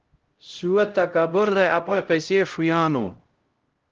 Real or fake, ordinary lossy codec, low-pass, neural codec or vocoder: fake; Opus, 16 kbps; 7.2 kHz; codec, 16 kHz, 0.5 kbps, X-Codec, HuBERT features, trained on LibriSpeech